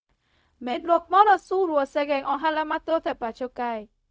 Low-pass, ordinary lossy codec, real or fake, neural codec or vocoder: none; none; fake; codec, 16 kHz, 0.4 kbps, LongCat-Audio-Codec